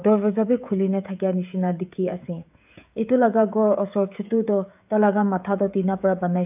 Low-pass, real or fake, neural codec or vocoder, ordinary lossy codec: 3.6 kHz; fake; codec, 16 kHz, 16 kbps, FreqCodec, smaller model; AAC, 32 kbps